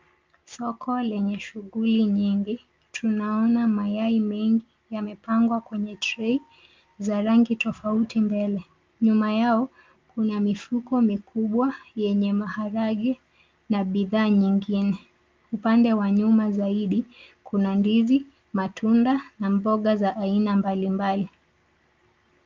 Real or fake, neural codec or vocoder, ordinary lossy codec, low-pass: real; none; Opus, 32 kbps; 7.2 kHz